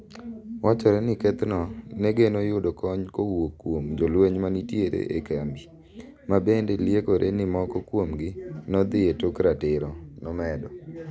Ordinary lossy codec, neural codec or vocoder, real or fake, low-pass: none; none; real; none